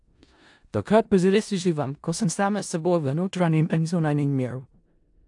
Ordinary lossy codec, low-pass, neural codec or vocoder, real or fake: AAC, 64 kbps; 10.8 kHz; codec, 16 kHz in and 24 kHz out, 0.4 kbps, LongCat-Audio-Codec, four codebook decoder; fake